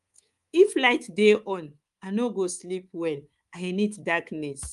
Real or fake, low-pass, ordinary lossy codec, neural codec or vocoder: fake; 10.8 kHz; Opus, 32 kbps; codec, 24 kHz, 3.1 kbps, DualCodec